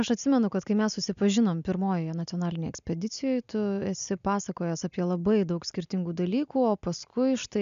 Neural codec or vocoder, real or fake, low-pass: none; real; 7.2 kHz